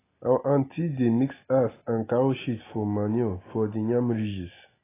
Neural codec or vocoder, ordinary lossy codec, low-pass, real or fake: none; AAC, 16 kbps; 3.6 kHz; real